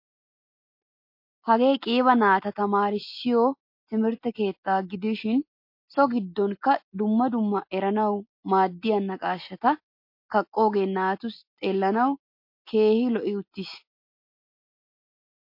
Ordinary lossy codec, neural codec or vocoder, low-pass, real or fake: MP3, 32 kbps; none; 5.4 kHz; real